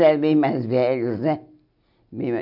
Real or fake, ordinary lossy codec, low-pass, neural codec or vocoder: real; none; 5.4 kHz; none